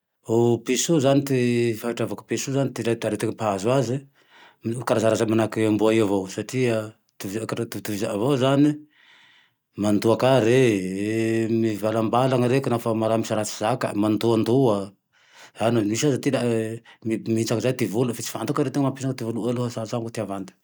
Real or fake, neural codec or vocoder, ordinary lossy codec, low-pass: real; none; none; none